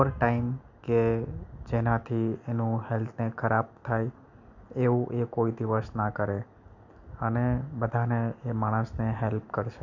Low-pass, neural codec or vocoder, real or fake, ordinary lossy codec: 7.2 kHz; none; real; none